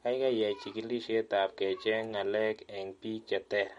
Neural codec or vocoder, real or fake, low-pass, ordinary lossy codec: none; real; 10.8 kHz; MP3, 48 kbps